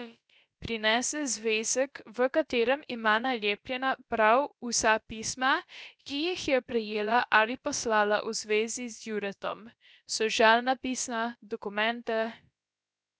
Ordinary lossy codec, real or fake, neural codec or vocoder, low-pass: none; fake; codec, 16 kHz, about 1 kbps, DyCAST, with the encoder's durations; none